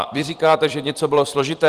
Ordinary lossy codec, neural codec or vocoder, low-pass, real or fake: Opus, 24 kbps; vocoder, 44.1 kHz, 128 mel bands every 256 samples, BigVGAN v2; 14.4 kHz; fake